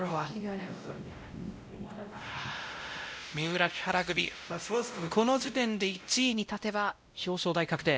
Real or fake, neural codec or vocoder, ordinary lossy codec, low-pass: fake; codec, 16 kHz, 0.5 kbps, X-Codec, WavLM features, trained on Multilingual LibriSpeech; none; none